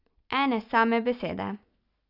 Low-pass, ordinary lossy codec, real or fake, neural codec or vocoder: 5.4 kHz; none; real; none